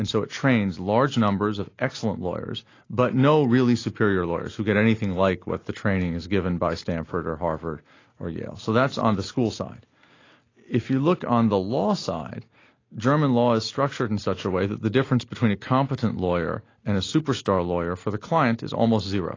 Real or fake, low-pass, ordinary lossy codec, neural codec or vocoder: real; 7.2 kHz; AAC, 32 kbps; none